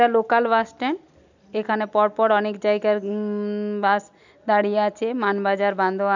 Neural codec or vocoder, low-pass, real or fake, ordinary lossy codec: none; 7.2 kHz; real; none